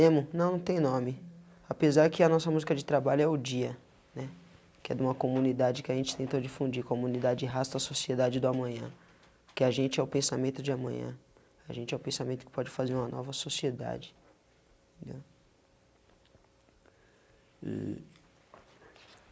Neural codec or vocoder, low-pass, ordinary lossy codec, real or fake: none; none; none; real